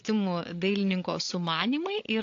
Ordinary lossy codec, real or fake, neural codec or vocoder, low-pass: AAC, 48 kbps; fake; codec, 16 kHz, 8 kbps, FreqCodec, larger model; 7.2 kHz